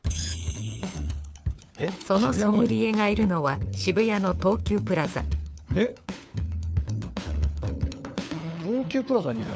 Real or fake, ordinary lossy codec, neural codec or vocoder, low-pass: fake; none; codec, 16 kHz, 4 kbps, FunCodec, trained on LibriTTS, 50 frames a second; none